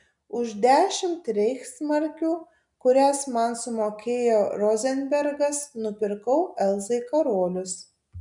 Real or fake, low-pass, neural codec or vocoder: real; 10.8 kHz; none